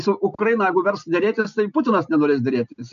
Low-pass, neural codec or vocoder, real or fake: 7.2 kHz; none; real